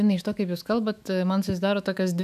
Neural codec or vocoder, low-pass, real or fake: autoencoder, 48 kHz, 128 numbers a frame, DAC-VAE, trained on Japanese speech; 14.4 kHz; fake